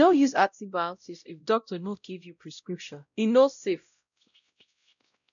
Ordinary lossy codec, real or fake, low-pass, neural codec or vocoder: none; fake; 7.2 kHz; codec, 16 kHz, 0.5 kbps, X-Codec, WavLM features, trained on Multilingual LibriSpeech